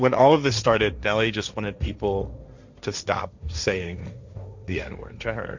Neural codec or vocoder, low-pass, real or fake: codec, 16 kHz, 1.1 kbps, Voila-Tokenizer; 7.2 kHz; fake